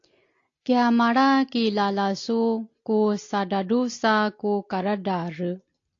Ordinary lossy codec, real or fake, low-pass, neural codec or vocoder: AAC, 48 kbps; real; 7.2 kHz; none